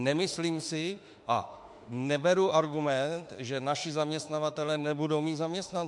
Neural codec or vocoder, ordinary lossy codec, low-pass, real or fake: autoencoder, 48 kHz, 32 numbers a frame, DAC-VAE, trained on Japanese speech; MP3, 64 kbps; 10.8 kHz; fake